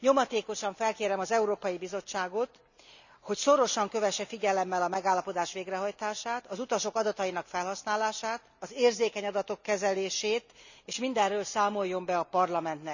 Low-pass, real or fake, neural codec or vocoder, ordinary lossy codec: 7.2 kHz; real; none; none